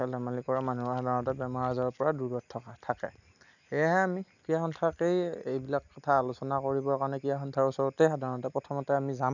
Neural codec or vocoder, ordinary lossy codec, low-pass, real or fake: none; none; 7.2 kHz; real